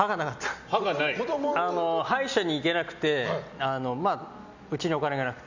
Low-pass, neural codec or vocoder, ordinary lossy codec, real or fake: 7.2 kHz; none; Opus, 64 kbps; real